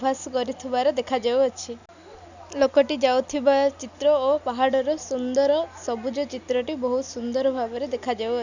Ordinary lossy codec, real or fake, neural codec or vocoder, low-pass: none; real; none; 7.2 kHz